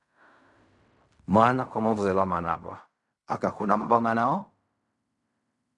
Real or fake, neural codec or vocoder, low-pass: fake; codec, 16 kHz in and 24 kHz out, 0.4 kbps, LongCat-Audio-Codec, fine tuned four codebook decoder; 10.8 kHz